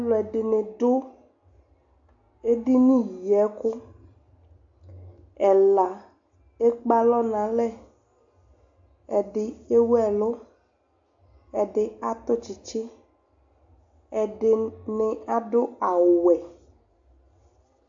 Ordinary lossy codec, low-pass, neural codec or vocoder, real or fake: AAC, 64 kbps; 7.2 kHz; none; real